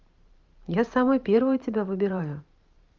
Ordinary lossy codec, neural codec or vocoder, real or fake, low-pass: Opus, 32 kbps; vocoder, 44.1 kHz, 128 mel bands every 512 samples, BigVGAN v2; fake; 7.2 kHz